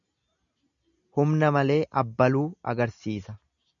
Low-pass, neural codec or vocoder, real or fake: 7.2 kHz; none; real